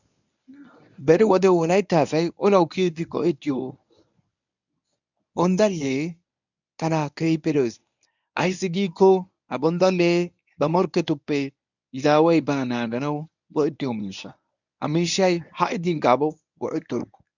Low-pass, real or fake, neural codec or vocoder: 7.2 kHz; fake; codec, 24 kHz, 0.9 kbps, WavTokenizer, medium speech release version 1